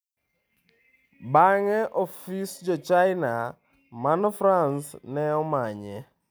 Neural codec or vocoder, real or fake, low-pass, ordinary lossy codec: none; real; none; none